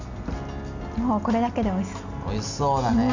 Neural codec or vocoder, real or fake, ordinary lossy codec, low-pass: none; real; none; 7.2 kHz